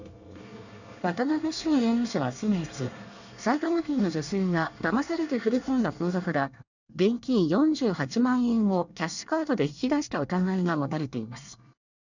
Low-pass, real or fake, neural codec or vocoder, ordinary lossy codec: 7.2 kHz; fake; codec, 24 kHz, 1 kbps, SNAC; none